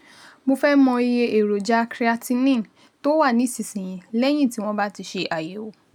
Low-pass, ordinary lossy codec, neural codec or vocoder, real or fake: 19.8 kHz; none; none; real